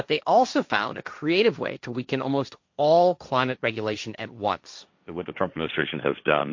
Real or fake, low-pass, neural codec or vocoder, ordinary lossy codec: fake; 7.2 kHz; codec, 16 kHz, 1.1 kbps, Voila-Tokenizer; MP3, 48 kbps